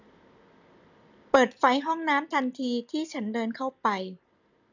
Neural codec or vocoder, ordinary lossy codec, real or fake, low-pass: none; none; real; 7.2 kHz